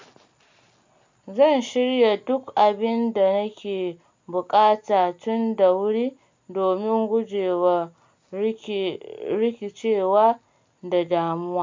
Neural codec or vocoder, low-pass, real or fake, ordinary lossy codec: none; 7.2 kHz; real; MP3, 64 kbps